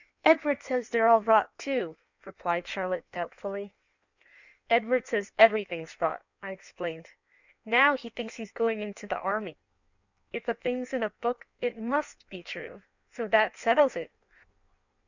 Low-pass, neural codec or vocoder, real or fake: 7.2 kHz; codec, 16 kHz in and 24 kHz out, 1.1 kbps, FireRedTTS-2 codec; fake